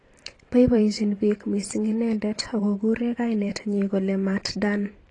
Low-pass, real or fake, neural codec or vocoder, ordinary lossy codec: 10.8 kHz; fake; vocoder, 44.1 kHz, 128 mel bands every 512 samples, BigVGAN v2; AAC, 32 kbps